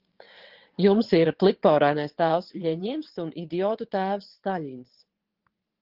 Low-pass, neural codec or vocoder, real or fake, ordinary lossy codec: 5.4 kHz; vocoder, 22.05 kHz, 80 mel bands, WaveNeXt; fake; Opus, 16 kbps